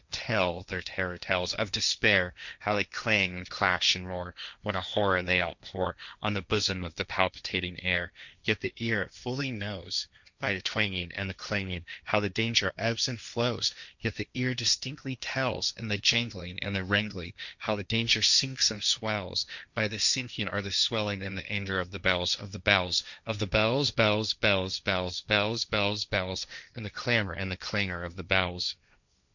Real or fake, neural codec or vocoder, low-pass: fake; codec, 16 kHz, 1.1 kbps, Voila-Tokenizer; 7.2 kHz